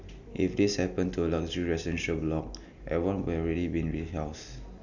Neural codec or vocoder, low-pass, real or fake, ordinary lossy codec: none; 7.2 kHz; real; none